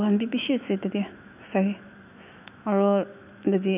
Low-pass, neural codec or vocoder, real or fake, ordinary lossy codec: 3.6 kHz; autoencoder, 48 kHz, 128 numbers a frame, DAC-VAE, trained on Japanese speech; fake; none